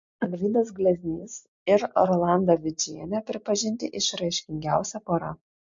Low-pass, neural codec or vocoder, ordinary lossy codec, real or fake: 7.2 kHz; none; MP3, 48 kbps; real